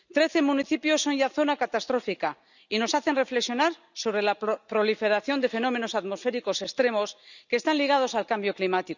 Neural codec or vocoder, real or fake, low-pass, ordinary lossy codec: none; real; 7.2 kHz; none